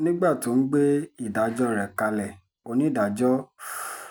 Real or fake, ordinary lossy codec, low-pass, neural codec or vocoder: real; none; none; none